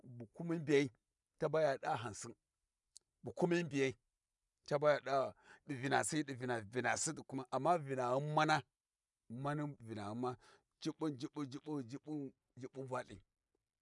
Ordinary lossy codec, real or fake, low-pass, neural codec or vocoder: none; real; 10.8 kHz; none